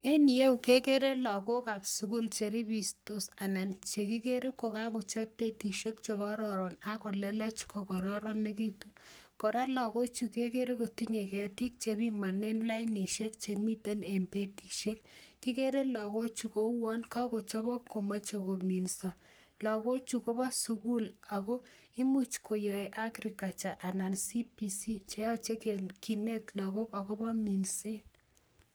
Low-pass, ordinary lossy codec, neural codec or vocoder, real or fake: none; none; codec, 44.1 kHz, 3.4 kbps, Pupu-Codec; fake